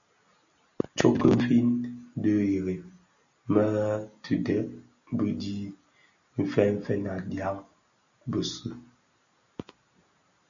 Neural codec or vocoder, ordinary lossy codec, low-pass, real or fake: none; MP3, 96 kbps; 7.2 kHz; real